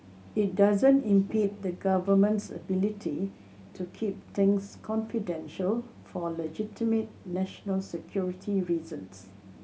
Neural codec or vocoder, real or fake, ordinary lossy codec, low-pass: none; real; none; none